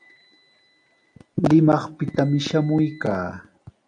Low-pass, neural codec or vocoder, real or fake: 9.9 kHz; none; real